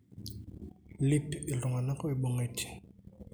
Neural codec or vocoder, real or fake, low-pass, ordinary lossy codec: none; real; none; none